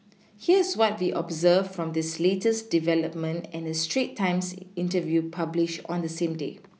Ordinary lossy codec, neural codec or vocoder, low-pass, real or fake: none; none; none; real